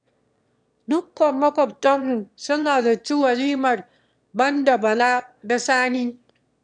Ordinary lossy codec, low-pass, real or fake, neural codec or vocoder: none; 9.9 kHz; fake; autoencoder, 22.05 kHz, a latent of 192 numbers a frame, VITS, trained on one speaker